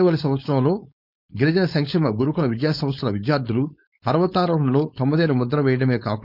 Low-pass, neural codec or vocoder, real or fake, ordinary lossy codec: 5.4 kHz; codec, 16 kHz, 4.8 kbps, FACodec; fake; none